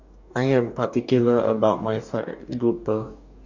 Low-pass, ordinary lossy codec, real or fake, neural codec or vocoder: 7.2 kHz; none; fake; codec, 44.1 kHz, 2.6 kbps, DAC